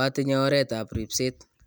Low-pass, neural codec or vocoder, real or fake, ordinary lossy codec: none; none; real; none